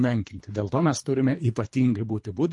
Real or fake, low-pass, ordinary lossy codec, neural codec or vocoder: fake; 10.8 kHz; MP3, 48 kbps; codec, 24 kHz, 3 kbps, HILCodec